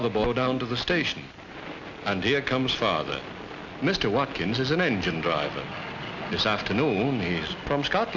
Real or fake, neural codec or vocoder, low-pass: real; none; 7.2 kHz